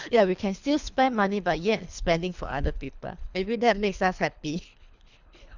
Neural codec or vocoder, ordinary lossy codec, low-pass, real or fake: codec, 24 kHz, 3 kbps, HILCodec; none; 7.2 kHz; fake